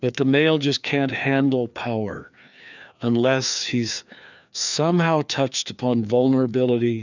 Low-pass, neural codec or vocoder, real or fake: 7.2 kHz; codec, 16 kHz, 2 kbps, FreqCodec, larger model; fake